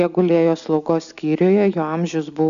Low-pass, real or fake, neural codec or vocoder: 7.2 kHz; real; none